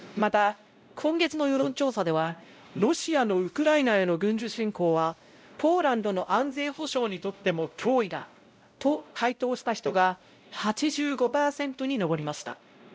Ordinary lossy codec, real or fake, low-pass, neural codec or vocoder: none; fake; none; codec, 16 kHz, 0.5 kbps, X-Codec, WavLM features, trained on Multilingual LibriSpeech